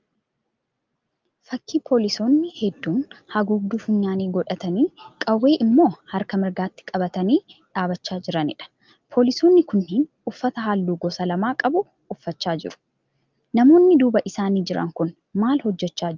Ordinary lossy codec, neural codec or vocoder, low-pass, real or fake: Opus, 32 kbps; none; 7.2 kHz; real